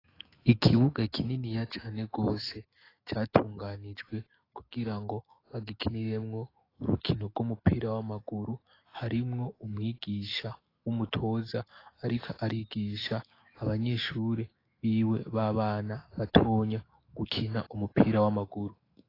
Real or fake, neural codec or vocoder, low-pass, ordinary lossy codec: fake; autoencoder, 48 kHz, 128 numbers a frame, DAC-VAE, trained on Japanese speech; 5.4 kHz; AAC, 24 kbps